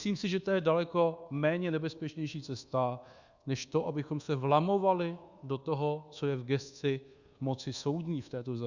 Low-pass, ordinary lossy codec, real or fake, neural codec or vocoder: 7.2 kHz; Opus, 64 kbps; fake; codec, 24 kHz, 1.2 kbps, DualCodec